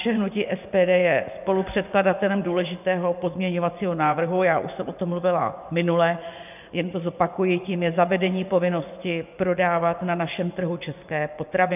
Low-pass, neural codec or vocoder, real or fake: 3.6 kHz; vocoder, 24 kHz, 100 mel bands, Vocos; fake